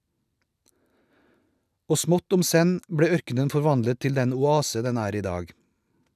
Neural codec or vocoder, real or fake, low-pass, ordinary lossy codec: none; real; 14.4 kHz; none